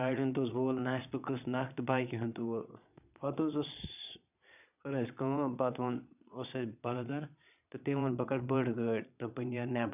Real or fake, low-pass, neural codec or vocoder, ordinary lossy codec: fake; 3.6 kHz; vocoder, 22.05 kHz, 80 mel bands, Vocos; none